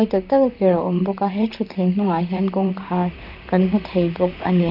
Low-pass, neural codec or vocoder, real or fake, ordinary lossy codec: 5.4 kHz; vocoder, 44.1 kHz, 128 mel bands, Pupu-Vocoder; fake; none